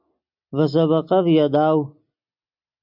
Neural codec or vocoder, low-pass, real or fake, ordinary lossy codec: none; 5.4 kHz; real; MP3, 48 kbps